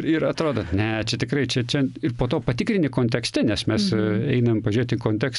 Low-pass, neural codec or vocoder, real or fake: 10.8 kHz; none; real